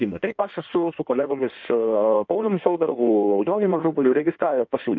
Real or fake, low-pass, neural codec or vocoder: fake; 7.2 kHz; codec, 16 kHz in and 24 kHz out, 1.1 kbps, FireRedTTS-2 codec